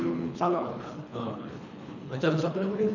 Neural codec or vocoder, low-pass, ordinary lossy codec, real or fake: codec, 24 kHz, 3 kbps, HILCodec; 7.2 kHz; none; fake